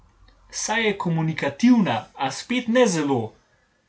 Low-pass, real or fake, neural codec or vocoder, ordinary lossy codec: none; real; none; none